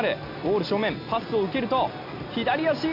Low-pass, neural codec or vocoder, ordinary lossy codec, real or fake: 5.4 kHz; none; MP3, 48 kbps; real